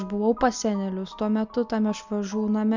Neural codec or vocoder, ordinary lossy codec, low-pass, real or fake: none; MP3, 64 kbps; 7.2 kHz; real